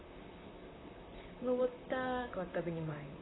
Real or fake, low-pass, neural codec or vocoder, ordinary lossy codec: fake; 7.2 kHz; vocoder, 44.1 kHz, 128 mel bands, Pupu-Vocoder; AAC, 16 kbps